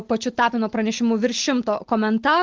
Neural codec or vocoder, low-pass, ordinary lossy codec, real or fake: none; 7.2 kHz; Opus, 16 kbps; real